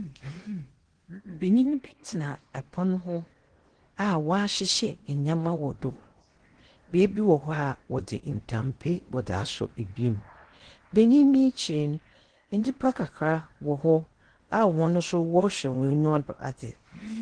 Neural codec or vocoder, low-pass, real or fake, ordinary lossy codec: codec, 16 kHz in and 24 kHz out, 0.8 kbps, FocalCodec, streaming, 65536 codes; 9.9 kHz; fake; Opus, 16 kbps